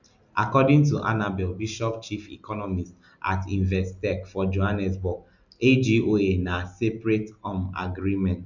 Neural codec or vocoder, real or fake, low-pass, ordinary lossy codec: none; real; 7.2 kHz; none